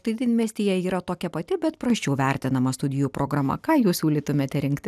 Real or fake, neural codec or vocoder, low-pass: real; none; 14.4 kHz